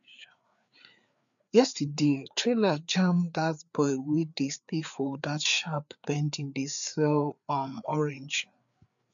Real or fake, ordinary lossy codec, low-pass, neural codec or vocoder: fake; none; 7.2 kHz; codec, 16 kHz, 4 kbps, FreqCodec, larger model